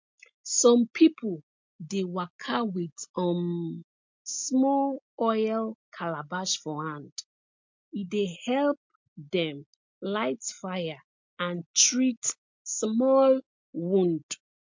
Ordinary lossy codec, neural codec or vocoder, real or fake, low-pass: MP3, 48 kbps; none; real; 7.2 kHz